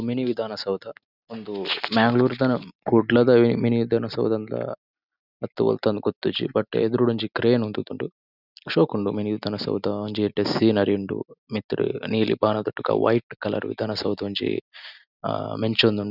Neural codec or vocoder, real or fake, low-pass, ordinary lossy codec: none; real; 5.4 kHz; none